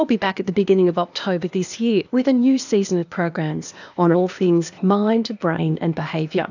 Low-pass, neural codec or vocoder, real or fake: 7.2 kHz; codec, 16 kHz, 0.8 kbps, ZipCodec; fake